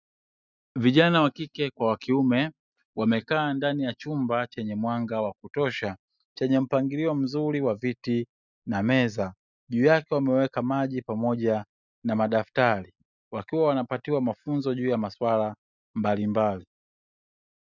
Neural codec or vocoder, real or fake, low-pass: none; real; 7.2 kHz